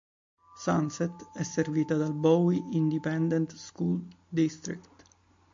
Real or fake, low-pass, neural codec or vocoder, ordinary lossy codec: real; 7.2 kHz; none; MP3, 48 kbps